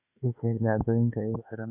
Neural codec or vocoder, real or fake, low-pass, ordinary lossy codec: autoencoder, 48 kHz, 32 numbers a frame, DAC-VAE, trained on Japanese speech; fake; 3.6 kHz; none